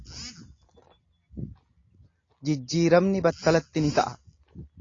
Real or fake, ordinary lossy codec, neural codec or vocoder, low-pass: real; AAC, 32 kbps; none; 7.2 kHz